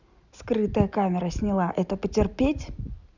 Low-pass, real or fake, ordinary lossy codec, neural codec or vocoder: 7.2 kHz; real; none; none